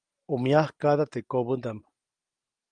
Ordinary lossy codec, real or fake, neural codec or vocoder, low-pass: Opus, 24 kbps; real; none; 9.9 kHz